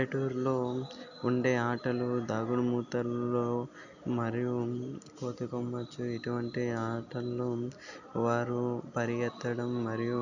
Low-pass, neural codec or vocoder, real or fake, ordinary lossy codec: 7.2 kHz; none; real; none